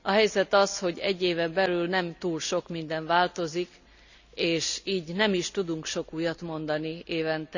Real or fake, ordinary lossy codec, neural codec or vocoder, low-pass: real; none; none; 7.2 kHz